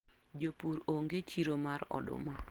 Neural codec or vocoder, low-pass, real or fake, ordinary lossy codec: none; 19.8 kHz; real; Opus, 16 kbps